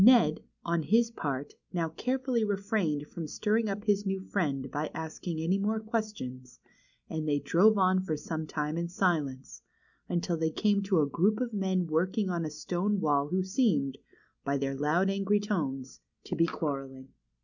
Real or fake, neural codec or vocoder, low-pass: real; none; 7.2 kHz